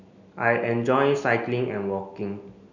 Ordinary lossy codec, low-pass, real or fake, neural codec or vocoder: none; 7.2 kHz; real; none